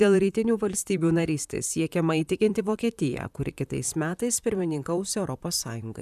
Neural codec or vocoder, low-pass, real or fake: vocoder, 44.1 kHz, 128 mel bands, Pupu-Vocoder; 14.4 kHz; fake